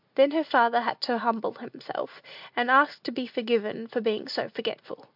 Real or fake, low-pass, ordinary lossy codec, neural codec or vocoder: real; 5.4 kHz; MP3, 48 kbps; none